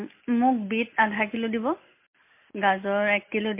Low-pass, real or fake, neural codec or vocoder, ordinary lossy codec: 3.6 kHz; real; none; MP3, 24 kbps